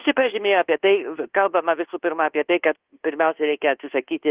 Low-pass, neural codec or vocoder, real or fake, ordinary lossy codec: 3.6 kHz; codec, 24 kHz, 1.2 kbps, DualCodec; fake; Opus, 16 kbps